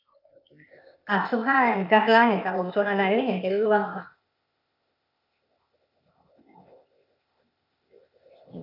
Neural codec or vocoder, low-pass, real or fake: codec, 16 kHz, 0.8 kbps, ZipCodec; 5.4 kHz; fake